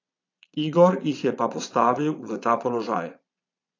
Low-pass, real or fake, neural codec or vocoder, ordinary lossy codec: 7.2 kHz; fake; vocoder, 22.05 kHz, 80 mel bands, Vocos; AAC, 48 kbps